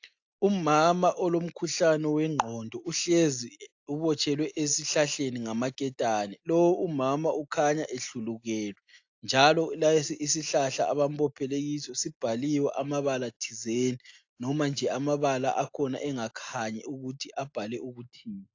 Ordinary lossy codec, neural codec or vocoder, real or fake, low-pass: AAC, 48 kbps; none; real; 7.2 kHz